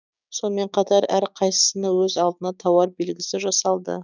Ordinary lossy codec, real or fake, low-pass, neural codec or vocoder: none; fake; 7.2 kHz; codec, 16 kHz, 6 kbps, DAC